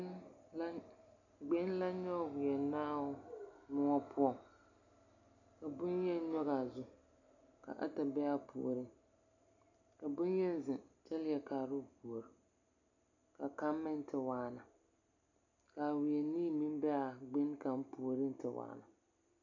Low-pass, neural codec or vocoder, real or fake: 7.2 kHz; none; real